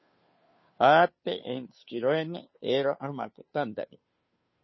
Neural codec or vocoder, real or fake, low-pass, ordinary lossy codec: codec, 16 kHz, 2 kbps, FunCodec, trained on Chinese and English, 25 frames a second; fake; 7.2 kHz; MP3, 24 kbps